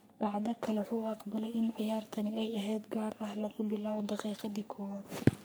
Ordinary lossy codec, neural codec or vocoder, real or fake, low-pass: none; codec, 44.1 kHz, 3.4 kbps, Pupu-Codec; fake; none